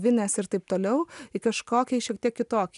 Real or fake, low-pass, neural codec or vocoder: real; 10.8 kHz; none